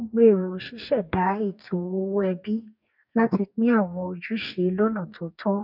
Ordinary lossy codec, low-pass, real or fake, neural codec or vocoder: none; 5.4 kHz; fake; codec, 44.1 kHz, 2.6 kbps, DAC